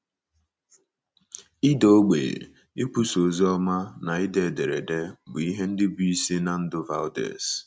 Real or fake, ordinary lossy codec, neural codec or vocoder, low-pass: real; none; none; none